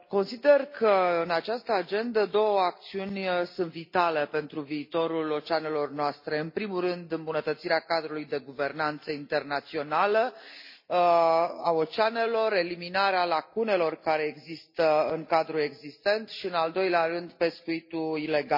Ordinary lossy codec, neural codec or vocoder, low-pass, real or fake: MP3, 32 kbps; none; 5.4 kHz; real